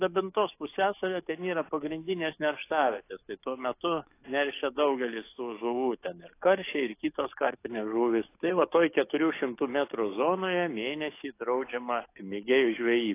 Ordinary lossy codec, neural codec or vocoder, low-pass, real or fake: AAC, 24 kbps; codec, 44.1 kHz, 7.8 kbps, DAC; 3.6 kHz; fake